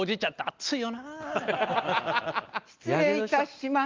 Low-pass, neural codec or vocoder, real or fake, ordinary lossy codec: 7.2 kHz; none; real; Opus, 32 kbps